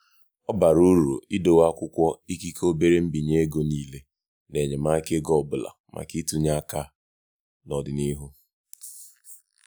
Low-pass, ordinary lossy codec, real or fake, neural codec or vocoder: none; none; real; none